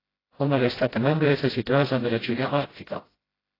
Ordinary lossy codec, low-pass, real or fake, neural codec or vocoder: AAC, 24 kbps; 5.4 kHz; fake; codec, 16 kHz, 0.5 kbps, FreqCodec, smaller model